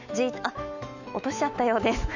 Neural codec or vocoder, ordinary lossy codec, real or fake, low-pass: autoencoder, 48 kHz, 128 numbers a frame, DAC-VAE, trained on Japanese speech; none; fake; 7.2 kHz